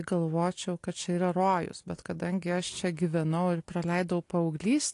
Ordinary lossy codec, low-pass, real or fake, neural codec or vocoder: AAC, 48 kbps; 10.8 kHz; real; none